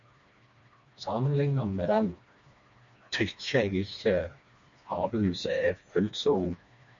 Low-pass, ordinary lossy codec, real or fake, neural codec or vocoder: 7.2 kHz; MP3, 48 kbps; fake; codec, 16 kHz, 2 kbps, FreqCodec, smaller model